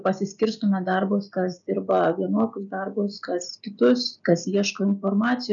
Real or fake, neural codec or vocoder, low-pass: real; none; 7.2 kHz